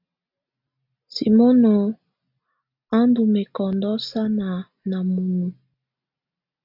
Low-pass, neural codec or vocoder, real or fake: 5.4 kHz; none; real